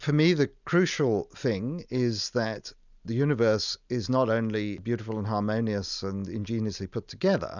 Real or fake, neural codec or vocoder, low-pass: real; none; 7.2 kHz